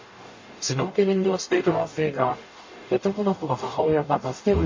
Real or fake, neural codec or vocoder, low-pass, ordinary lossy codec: fake; codec, 44.1 kHz, 0.9 kbps, DAC; 7.2 kHz; MP3, 32 kbps